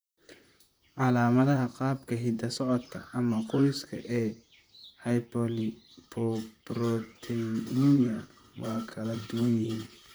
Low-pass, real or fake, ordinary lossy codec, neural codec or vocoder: none; fake; none; vocoder, 44.1 kHz, 128 mel bands, Pupu-Vocoder